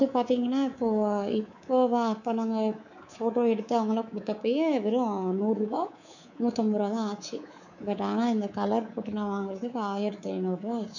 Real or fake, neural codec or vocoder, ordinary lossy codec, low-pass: fake; codec, 24 kHz, 3.1 kbps, DualCodec; none; 7.2 kHz